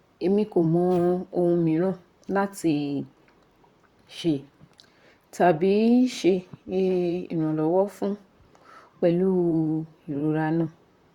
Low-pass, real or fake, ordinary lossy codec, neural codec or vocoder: 19.8 kHz; fake; Opus, 64 kbps; vocoder, 44.1 kHz, 128 mel bands, Pupu-Vocoder